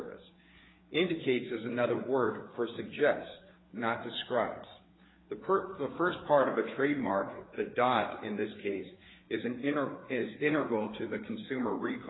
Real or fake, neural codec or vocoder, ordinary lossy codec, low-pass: fake; codec, 16 kHz, 4 kbps, FreqCodec, larger model; AAC, 16 kbps; 7.2 kHz